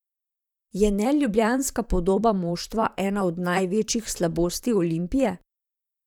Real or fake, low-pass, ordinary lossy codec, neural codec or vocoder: fake; 19.8 kHz; none; vocoder, 44.1 kHz, 128 mel bands, Pupu-Vocoder